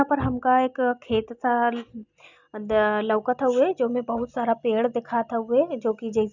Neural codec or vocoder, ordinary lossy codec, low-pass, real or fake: none; none; 7.2 kHz; real